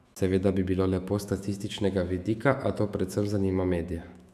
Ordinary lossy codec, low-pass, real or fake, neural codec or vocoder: AAC, 96 kbps; 14.4 kHz; fake; autoencoder, 48 kHz, 128 numbers a frame, DAC-VAE, trained on Japanese speech